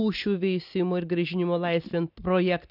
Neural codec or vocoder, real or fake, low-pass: none; real; 5.4 kHz